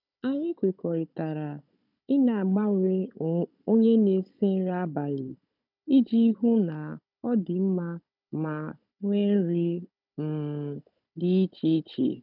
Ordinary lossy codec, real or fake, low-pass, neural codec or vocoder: none; fake; 5.4 kHz; codec, 16 kHz, 16 kbps, FunCodec, trained on Chinese and English, 50 frames a second